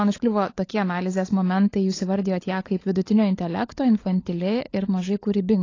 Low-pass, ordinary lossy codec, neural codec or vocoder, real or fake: 7.2 kHz; AAC, 32 kbps; codec, 16 kHz, 16 kbps, FunCodec, trained on LibriTTS, 50 frames a second; fake